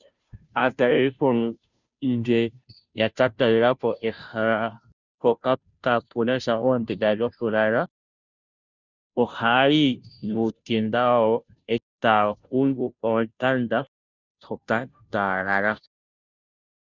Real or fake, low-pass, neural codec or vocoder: fake; 7.2 kHz; codec, 16 kHz, 0.5 kbps, FunCodec, trained on Chinese and English, 25 frames a second